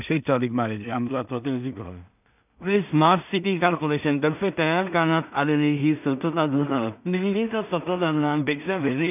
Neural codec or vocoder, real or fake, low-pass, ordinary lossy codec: codec, 16 kHz in and 24 kHz out, 0.4 kbps, LongCat-Audio-Codec, two codebook decoder; fake; 3.6 kHz; none